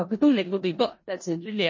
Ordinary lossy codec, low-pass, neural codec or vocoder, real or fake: MP3, 32 kbps; 7.2 kHz; codec, 16 kHz in and 24 kHz out, 0.4 kbps, LongCat-Audio-Codec, four codebook decoder; fake